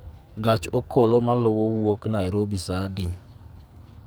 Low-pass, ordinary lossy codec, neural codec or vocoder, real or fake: none; none; codec, 44.1 kHz, 2.6 kbps, SNAC; fake